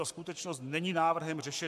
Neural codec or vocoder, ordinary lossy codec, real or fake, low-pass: codec, 44.1 kHz, 7.8 kbps, Pupu-Codec; MP3, 96 kbps; fake; 14.4 kHz